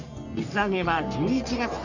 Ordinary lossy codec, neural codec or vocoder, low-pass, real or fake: none; codec, 44.1 kHz, 3.4 kbps, Pupu-Codec; 7.2 kHz; fake